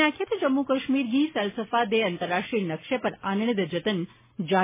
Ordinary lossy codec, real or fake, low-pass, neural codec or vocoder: MP3, 16 kbps; real; 3.6 kHz; none